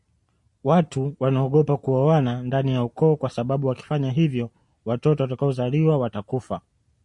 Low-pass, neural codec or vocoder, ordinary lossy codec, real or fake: 10.8 kHz; none; MP3, 64 kbps; real